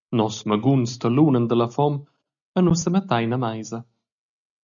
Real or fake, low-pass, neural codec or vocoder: real; 7.2 kHz; none